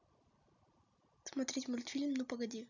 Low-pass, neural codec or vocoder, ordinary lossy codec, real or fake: 7.2 kHz; none; none; real